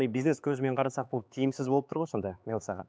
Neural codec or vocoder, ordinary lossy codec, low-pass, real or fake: codec, 16 kHz, 4 kbps, X-Codec, HuBERT features, trained on LibriSpeech; none; none; fake